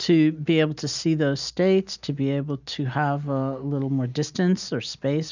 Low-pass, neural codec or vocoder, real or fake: 7.2 kHz; none; real